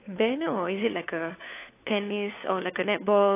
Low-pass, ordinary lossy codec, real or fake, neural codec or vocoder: 3.6 kHz; AAC, 24 kbps; fake; codec, 16 kHz, 4 kbps, FunCodec, trained on LibriTTS, 50 frames a second